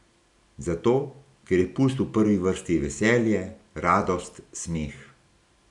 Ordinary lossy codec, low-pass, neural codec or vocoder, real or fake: none; 10.8 kHz; none; real